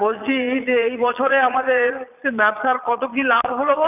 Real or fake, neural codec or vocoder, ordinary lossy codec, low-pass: fake; vocoder, 22.05 kHz, 80 mel bands, Vocos; none; 3.6 kHz